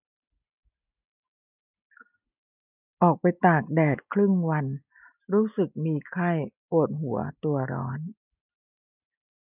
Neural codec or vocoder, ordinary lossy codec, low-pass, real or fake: none; none; 3.6 kHz; real